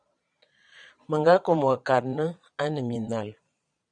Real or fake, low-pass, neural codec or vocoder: fake; 9.9 kHz; vocoder, 22.05 kHz, 80 mel bands, Vocos